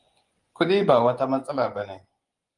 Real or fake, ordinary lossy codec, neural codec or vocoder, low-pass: fake; Opus, 32 kbps; vocoder, 24 kHz, 100 mel bands, Vocos; 10.8 kHz